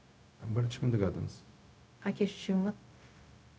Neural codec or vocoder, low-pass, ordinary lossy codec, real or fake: codec, 16 kHz, 0.4 kbps, LongCat-Audio-Codec; none; none; fake